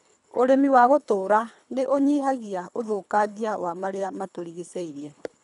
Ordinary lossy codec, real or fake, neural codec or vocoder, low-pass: none; fake; codec, 24 kHz, 3 kbps, HILCodec; 10.8 kHz